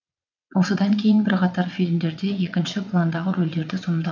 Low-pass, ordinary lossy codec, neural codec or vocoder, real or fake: 7.2 kHz; none; vocoder, 22.05 kHz, 80 mel bands, WaveNeXt; fake